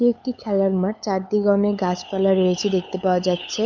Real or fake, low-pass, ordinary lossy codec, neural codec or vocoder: fake; none; none; codec, 16 kHz, 8 kbps, FunCodec, trained on LibriTTS, 25 frames a second